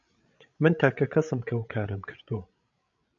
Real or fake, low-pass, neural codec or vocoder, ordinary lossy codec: fake; 7.2 kHz; codec, 16 kHz, 16 kbps, FreqCodec, larger model; MP3, 64 kbps